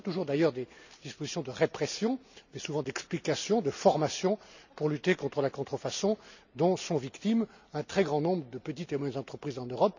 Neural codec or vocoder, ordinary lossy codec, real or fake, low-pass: none; none; real; 7.2 kHz